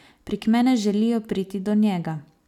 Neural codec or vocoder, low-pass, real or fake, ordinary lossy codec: none; 19.8 kHz; real; none